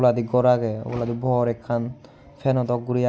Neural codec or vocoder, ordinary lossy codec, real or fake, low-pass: none; none; real; none